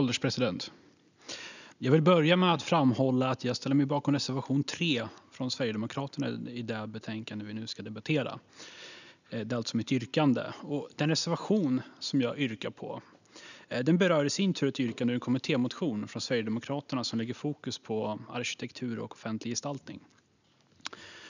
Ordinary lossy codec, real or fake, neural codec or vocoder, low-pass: none; real; none; 7.2 kHz